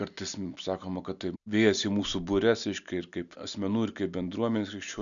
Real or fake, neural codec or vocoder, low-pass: real; none; 7.2 kHz